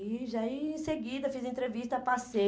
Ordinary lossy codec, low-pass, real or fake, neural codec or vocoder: none; none; real; none